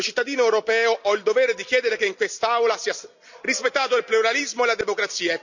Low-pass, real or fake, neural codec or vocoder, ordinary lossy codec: 7.2 kHz; real; none; none